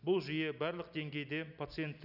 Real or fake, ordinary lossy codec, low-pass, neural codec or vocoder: real; none; 5.4 kHz; none